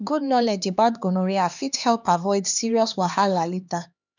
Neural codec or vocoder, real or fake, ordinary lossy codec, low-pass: codec, 16 kHz, 2 kbps, X-Codec, HuBERT features, trained on LibriSpeech; fake; none; 7.2 kHz